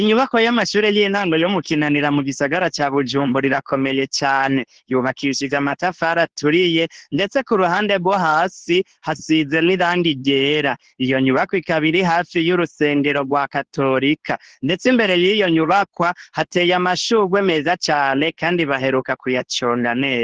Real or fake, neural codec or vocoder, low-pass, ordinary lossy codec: fake; codec, 24 kHz, 0.9 kbps, WavTokenizer, medium speech release version 2; 9.9 kHz; Opus, 16 kbps